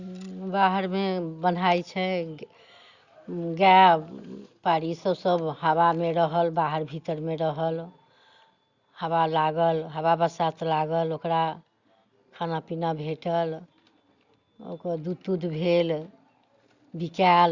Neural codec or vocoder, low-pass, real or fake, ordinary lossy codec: none; 7.2 kHz; real; Opus, 64 kbps